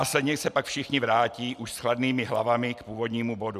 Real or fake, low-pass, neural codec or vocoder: real; 14.4 kHz; none